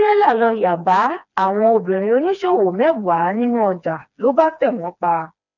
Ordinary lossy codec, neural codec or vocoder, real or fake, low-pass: none; codec, 16 kHz, 2 kbps, FreqCodec, smaller model; fake; 7.2 kHz